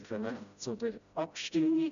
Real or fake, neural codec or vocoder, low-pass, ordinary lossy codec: fake; codec, 16 kHz, 0.5 kbps, FreqCodec, smaller model; 7.2 kHz; none